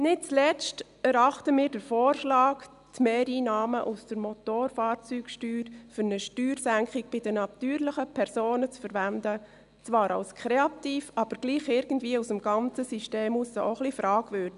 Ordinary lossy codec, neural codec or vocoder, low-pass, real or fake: none; none; 10.8 kHz; real